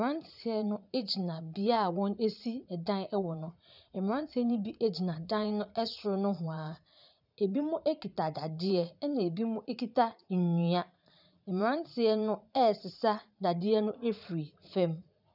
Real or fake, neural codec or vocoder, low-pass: fake; vocoder, 44.1 kHz, 80 mel bands, Vocos; 5.4 kHz